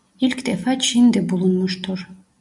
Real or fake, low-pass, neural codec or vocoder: real; 10.8 kHz; none